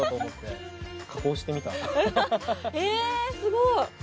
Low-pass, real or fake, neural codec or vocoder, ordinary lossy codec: none; real; none; none